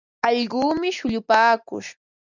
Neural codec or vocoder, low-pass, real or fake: none; 7.2 kHz; real